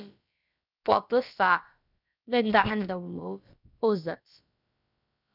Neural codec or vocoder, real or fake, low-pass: codec, 16 kHz, about 1 kbps, DyCAST, with the encoder's durations; fake; 5.4 kHz